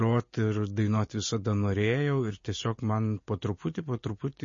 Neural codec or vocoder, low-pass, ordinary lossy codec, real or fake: none; 7.2 kHz; MP3, 32 kbps; real